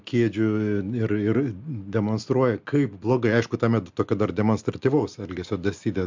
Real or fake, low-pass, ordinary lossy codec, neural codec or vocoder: real; 7.2 kHz; MP3, 64 kbps; none